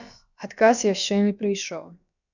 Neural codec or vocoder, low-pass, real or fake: codec, 16 kHz, about 1 kbps, DyCAST, with the encoder's durations; 7.2 kHz; fake